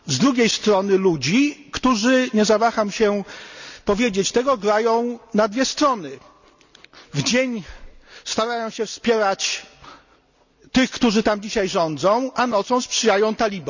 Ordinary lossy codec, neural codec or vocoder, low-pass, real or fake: none; none; 7.2 kHz; real